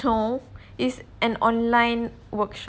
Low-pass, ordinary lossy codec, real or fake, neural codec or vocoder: none; none; real; none